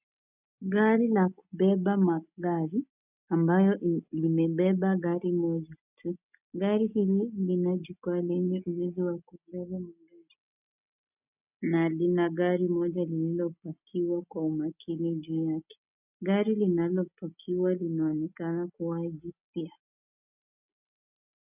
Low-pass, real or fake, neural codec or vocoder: 3.6 kHz; real; none